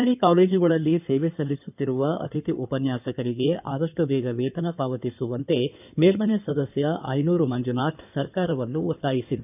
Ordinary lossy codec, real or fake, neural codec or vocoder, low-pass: none; fake; codec, 16 kHz in and 24 kHz out, 2.2 kbps, FireRedTTS-2 codec; 3.6 kHz